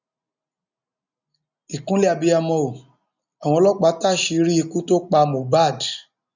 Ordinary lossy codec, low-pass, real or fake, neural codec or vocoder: none; 7.2 kHz; real; none